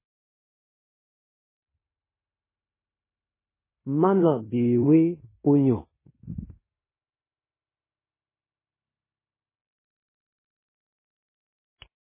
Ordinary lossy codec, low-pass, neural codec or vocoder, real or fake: MP3, 16 kbps; 3.6 kHz; codec, 16 kHz in and 24 kHz out, 0.9 kbps, LongCat-Audio-Codec, fine tuned four codebook decoder; fake